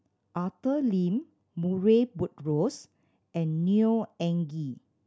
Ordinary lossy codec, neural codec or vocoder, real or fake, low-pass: none; none; real; none